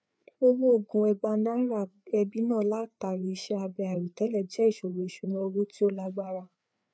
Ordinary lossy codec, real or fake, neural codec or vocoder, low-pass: none; fake; codec, 16 kHz, 4 kbps, FreqCodec, larger model; none